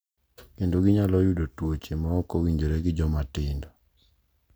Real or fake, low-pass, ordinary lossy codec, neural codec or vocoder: real; none; none; none